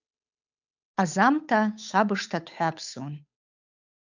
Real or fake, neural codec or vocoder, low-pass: fake; codec, 16 kHz, 8 kbps, FunCodec, trained on Chinese and English, 25 frames a second; 7.2 kHz